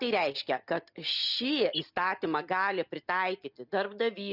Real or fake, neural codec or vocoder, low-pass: real; none; 5.4 kHz